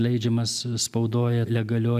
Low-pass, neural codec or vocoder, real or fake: 14.4 kHz; none; real